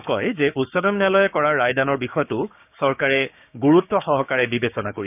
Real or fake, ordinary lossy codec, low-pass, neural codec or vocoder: fake; none; 3.6 kHz; codec, 16 kHz, 6 kbps, DAC